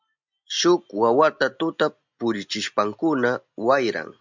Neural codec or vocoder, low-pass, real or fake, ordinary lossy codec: none; 7.2 kHz; real; MP3, 64 kbps